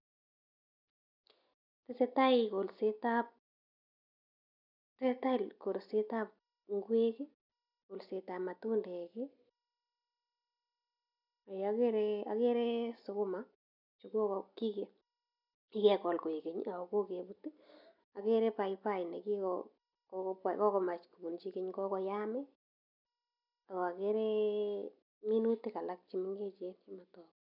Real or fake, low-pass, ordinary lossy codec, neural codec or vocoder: real; 5.4 kHz; none; none